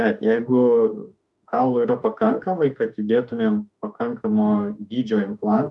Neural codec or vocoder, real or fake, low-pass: autoencoder, 48 kHz, 32 numbers a frame, DAC-VAE, trained on Japanese speech; fake; 10.8 kHz